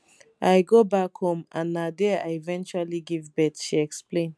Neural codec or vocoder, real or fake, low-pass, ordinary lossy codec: none; real; none; none